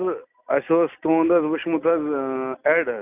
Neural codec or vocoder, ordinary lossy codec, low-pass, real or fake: none; none; 3.6 kHz; real